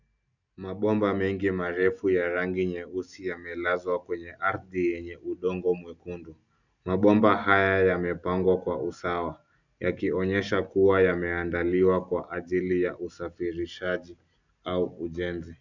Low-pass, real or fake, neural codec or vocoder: 7.2 kHz; real; none